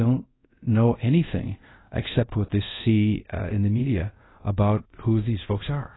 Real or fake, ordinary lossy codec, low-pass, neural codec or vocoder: fake; AAC, 16 kbps; 7.2 kHz; codec, 24 kHz, 0.5 kbps, DualCodec